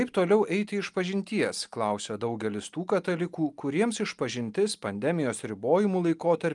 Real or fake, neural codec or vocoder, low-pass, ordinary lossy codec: real; none; 10.8 kHz; Opus, 32 kbps